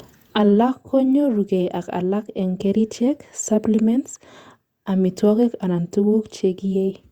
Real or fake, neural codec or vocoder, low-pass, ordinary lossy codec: fake; vocoder, 48 kHz, 128 mel bands, Vocos; 19.8 kHz; Opus, 64 kbps